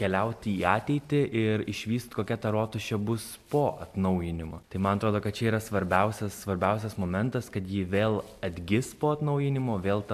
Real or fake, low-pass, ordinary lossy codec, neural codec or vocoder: real; 14.4 kHz; MP3, 96 kbps; none